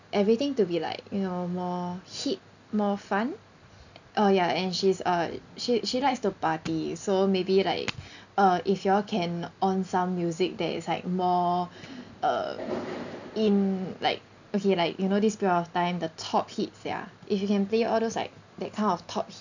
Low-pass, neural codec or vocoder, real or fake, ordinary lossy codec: 7.2 kHz; none; real; none